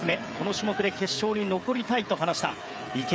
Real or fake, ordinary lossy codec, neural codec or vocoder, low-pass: fake; none; codec, 16 kHz, 16 kbps, FreqCodec, smaller model; none